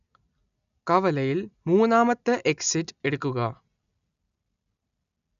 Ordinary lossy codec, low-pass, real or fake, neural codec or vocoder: none; 7.2 kHz; real; none